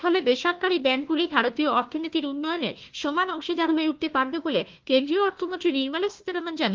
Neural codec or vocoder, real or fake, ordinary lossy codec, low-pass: codec, 16 kHz, 1 kbps, FunCodec, trained on Chinese and English, 50 frames a second; fake; Opus, 32 kbps; 7.2 kHz